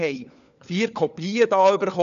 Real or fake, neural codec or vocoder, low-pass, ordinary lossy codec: fake; codec, 16 kHz, 4 kbps, FunCodec, trained on LibriTTS, 50 frames a second; 7.2 kHz; none